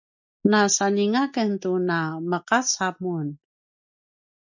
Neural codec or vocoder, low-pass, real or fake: none; 7.2 kHz; real